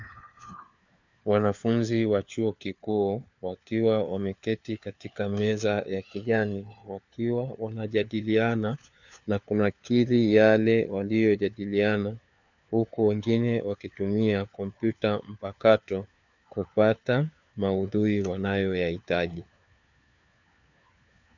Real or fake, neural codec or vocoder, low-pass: fake; codec, 16 kHz, 4 kbps, FunCodec, trained on LibriTTS, 50 frames a second; 7.2 kHz